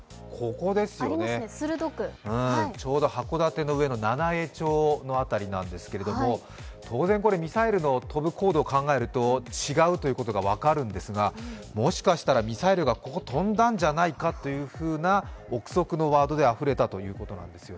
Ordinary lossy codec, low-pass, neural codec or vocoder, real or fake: none; none; none; real